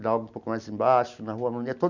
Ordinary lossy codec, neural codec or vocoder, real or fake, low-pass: none; none; real; 7.2 kHz